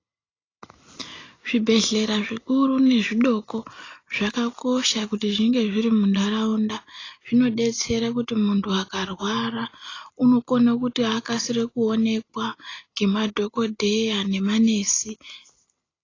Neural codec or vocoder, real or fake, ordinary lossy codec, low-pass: none; real; AAC, 32 kbps; 7.2 kHz